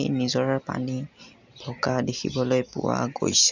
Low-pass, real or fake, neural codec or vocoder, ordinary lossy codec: 7.2 kHz; real; none; none